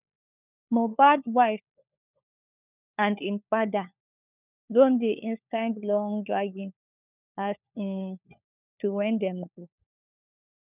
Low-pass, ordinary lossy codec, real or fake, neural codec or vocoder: 3.6 kHz; none; fake; codec, 16 kHz, 4 kbps, FunCodec, trained on LibriTTS, 50 frames a second